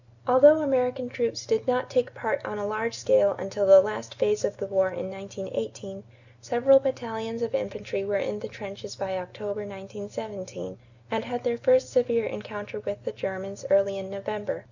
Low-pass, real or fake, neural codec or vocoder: 7.2 kHz; real; none